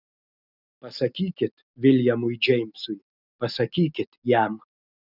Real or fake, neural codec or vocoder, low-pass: real; none; 5.4 kHz